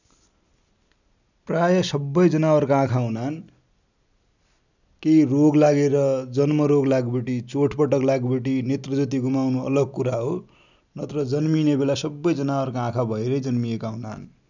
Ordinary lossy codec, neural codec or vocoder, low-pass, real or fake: none; none; 7.2 kHz; real